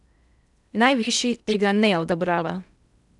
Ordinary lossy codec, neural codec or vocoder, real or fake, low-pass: none; codec, 16 kHz in and 24 kHz out, 0.6 kbps, FocalCodec, streaming, 2048 codes; fake; 10.8 kHz